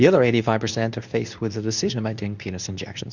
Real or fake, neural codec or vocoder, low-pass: fake; codec, 24 kHz, 0.9 kbps, WavTokenizer, medium speech release version 2; 7.2 kHz